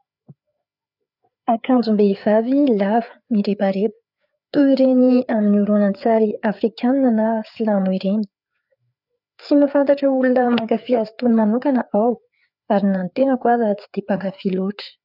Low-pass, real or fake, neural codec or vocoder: 5.4 kHz; fake; codec, 16 kHz, 4 kbps, FreqCodec, larger model